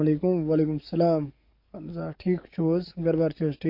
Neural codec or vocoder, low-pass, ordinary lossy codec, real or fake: codec, 44.1 kHz, 7.8 kbps, DAC; 5.4 kHz; MP3, 32 kbps; fake